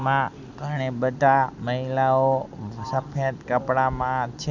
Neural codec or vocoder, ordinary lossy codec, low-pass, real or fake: none; none; 7.2 kHz; real